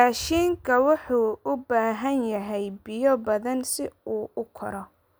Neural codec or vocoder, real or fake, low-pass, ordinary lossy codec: none; real; none; none